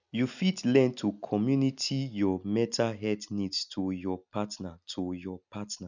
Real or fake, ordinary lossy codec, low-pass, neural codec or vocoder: real; none; 7.2 kHz; none